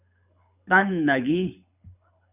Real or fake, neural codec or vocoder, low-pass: fake; codec, 24 kHz, 6 kbps, HILCodec; 3.6 kHz